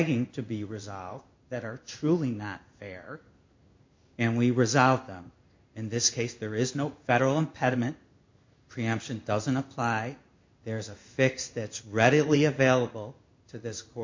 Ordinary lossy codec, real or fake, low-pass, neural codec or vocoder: MP3, 48 kbps; fake; 7.2 kHz; codec, 16 kHz in and 24 kHz out, 1 kbps, XY-Tokenizer